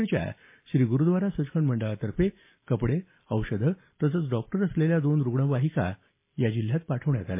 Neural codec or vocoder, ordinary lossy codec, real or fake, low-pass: none; MP3, 24 kbps; real; 3.6 kHz